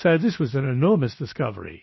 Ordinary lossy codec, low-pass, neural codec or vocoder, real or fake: MP3, 24 kbps; 7.2 kHz; codec, 16 kHz, 0.7 kbps, FocalCodec; fake